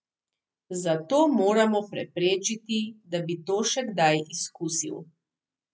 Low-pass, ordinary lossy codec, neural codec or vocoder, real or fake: none; none; none; real